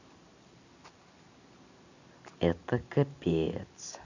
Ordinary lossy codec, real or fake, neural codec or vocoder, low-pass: none; real; none; 7.2 kHz